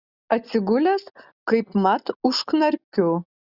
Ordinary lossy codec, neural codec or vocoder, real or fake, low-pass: Opus, 64 kbps; none; real; 5.4 kHz